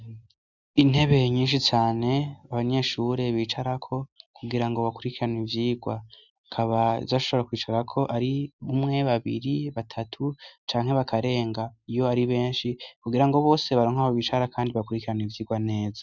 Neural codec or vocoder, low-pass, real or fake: none; 7.2 kHz; real